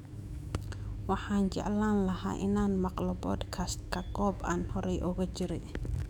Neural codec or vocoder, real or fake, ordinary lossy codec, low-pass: autoencoder, 48 kHz, 128 numbers a frame, DAC-VAE, trained on Japanese speech; fake; none; 19.8 kHz